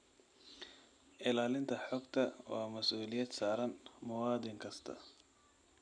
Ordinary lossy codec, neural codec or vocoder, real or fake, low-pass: none; none; real; 9.9 kHz